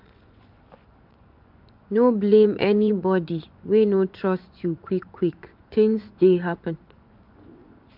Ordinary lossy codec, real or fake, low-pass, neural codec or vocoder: MP3, 48 kbps; fake; 5.4 kHz; vocoder, 24 kHz, 100 mel bands, Vocos